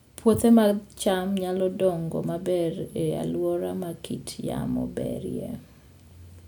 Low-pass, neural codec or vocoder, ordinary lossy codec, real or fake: none; none; none; real